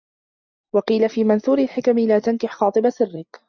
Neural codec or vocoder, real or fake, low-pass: none; real; 7.2 kHz